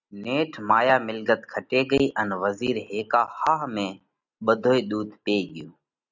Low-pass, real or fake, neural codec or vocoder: 7.2 kHz; real; none